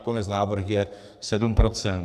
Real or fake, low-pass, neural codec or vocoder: fake; 14.4 kHz; codec, 44.1 kHz, 2.6 kbps, SNAC